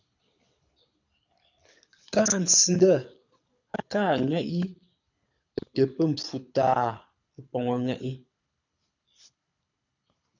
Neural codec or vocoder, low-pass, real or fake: codec, 24 kHz, 6 kbps, HILCodec; 7.2 kHz; fake